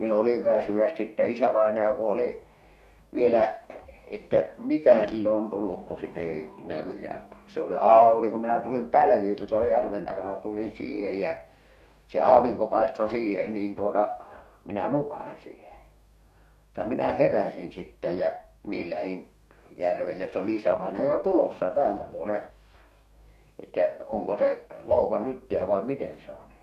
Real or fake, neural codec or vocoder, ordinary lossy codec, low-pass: fake; codec, 44.1 kHz, 2.6 kbps, DAC; none; 14.4 kHz